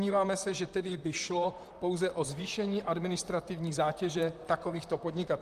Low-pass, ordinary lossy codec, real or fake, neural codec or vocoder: 14.4 kHz; Opus, 32 kbps; fake; vocoder, 44.1 kHz, 128 mel bands, Pupu-Vocoder